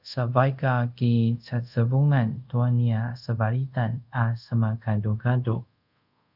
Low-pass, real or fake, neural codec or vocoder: 5.4 kHz; fake; codec, 24 kHz, 0.5 kbps, DualCodec